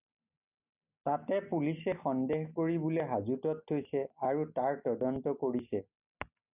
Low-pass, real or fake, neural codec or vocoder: 3.6 kHz; real; none